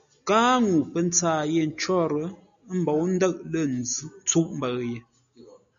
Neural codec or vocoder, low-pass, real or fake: none; 7.2 kHz; real